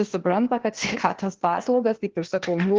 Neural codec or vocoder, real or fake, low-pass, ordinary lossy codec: codec, 16 kHz, 0.8 kbps, ZipCodec; fake; 7.2 kHz; Opus, 16 kbps